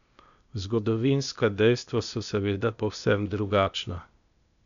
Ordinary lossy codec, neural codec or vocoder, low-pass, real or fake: none; codec, 16 kHz, 0.8 kbps, ZipCodec; 7.2 kHz; fake